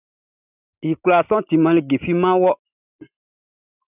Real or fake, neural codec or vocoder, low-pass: real; none; 3.6 kHz